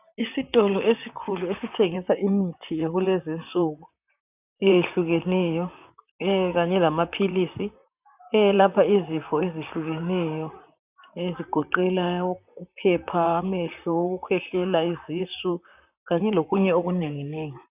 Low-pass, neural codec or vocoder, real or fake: 3.6 kHz; vocoder, 44.1 kHz, 128 mel bands, Pupu-Vocoder; fake